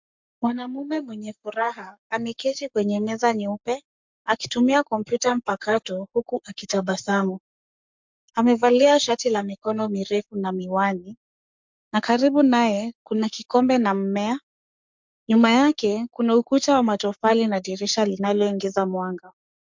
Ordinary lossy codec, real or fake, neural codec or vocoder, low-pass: MP3, 64 kbps; fake; codec, 44.1 kHz, 7.8 kbps, Pupu-Codec; 7.2 kHz